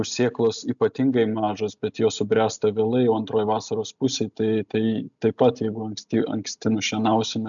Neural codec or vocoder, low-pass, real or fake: none; 7.2 kHz; real